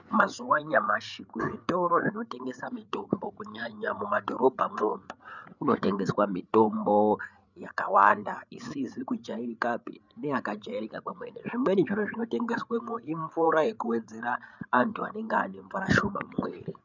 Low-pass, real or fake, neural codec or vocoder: 7.2 kHz; fake; codec, 16 kHz, 8 kbps, FreqCodec, larger model